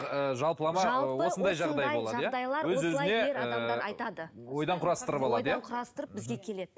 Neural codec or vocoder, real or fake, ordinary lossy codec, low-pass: none; real; none; none